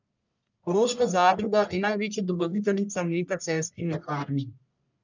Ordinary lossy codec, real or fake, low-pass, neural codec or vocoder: none; fake; 7.2 kHz; codec, 44.1 kHz, 1.7 kbps, Pupu-Codec